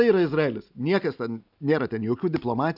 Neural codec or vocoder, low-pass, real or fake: none; 5.4 kHz; real